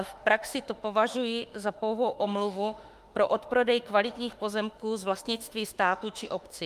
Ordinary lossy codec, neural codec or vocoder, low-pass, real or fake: Opus, 32 kbps; autoencoder, 48 kHz, 32 numbers a frame, DAC-VAE, trained on Japanese speech; 14.4 kHz; fake